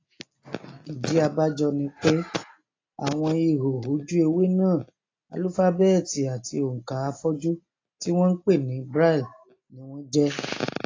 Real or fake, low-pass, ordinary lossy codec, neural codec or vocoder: real; 7.2 kHz; AAC, 32 kbps; none